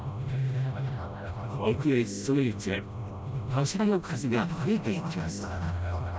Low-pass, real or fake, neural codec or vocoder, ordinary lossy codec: none; fake; codec, 16 kHz, 0.5 kbps, FreqCodec, smaller model; none